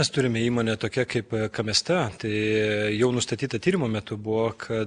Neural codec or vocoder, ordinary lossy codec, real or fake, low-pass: none; Opus, 64 kbps; real; 9.9 kHz